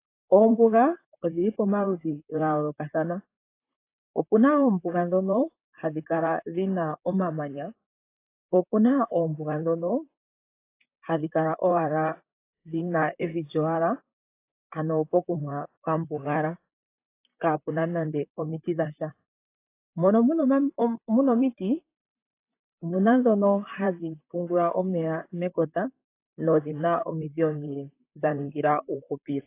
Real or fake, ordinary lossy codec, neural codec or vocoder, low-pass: fake; AAC, 24 kbps; vocoder, 44.1 kHz, 128 mel bands, Pupu-Vocoder; 3.6 kHz